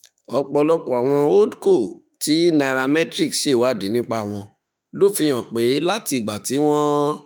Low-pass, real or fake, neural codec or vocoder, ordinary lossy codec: none; fake; autoencoder, 48 kHz, 32 numbers a frame, DAC-VAE, trained on Japanese speech; none